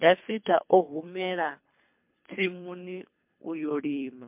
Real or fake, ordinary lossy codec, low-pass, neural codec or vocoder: fake; MP3, 32 kbps; 3.6 kHz; codec, 24 kHz, 3 kbps, HILCodec